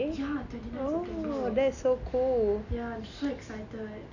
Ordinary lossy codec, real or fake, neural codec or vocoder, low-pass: none; real; none; 7.2 kHz